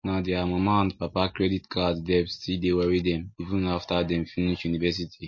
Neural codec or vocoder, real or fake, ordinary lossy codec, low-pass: none; real; MP3, 32 kbps; 7.2 kHz